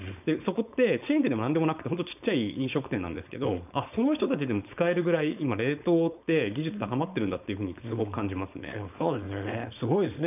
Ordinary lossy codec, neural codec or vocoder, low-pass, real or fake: none; codec, 16 kHz, 4.8 kbps, FACodec; 3.6 kHz; fake